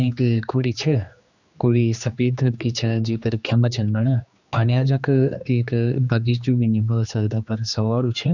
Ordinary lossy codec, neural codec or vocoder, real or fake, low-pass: none; codec, 16 kHz, 2 kbps, X-Codec, HuBERT features, trained on general audio; fake; 7.2 kHz